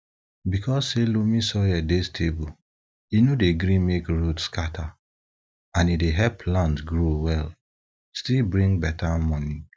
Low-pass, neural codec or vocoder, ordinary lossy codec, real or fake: none; none; none; real